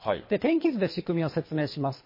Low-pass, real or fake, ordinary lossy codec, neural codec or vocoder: 5.4 kHz; fake; MP3, 24 kbps; codec, 24 kHz, 3 kbps, HILCodec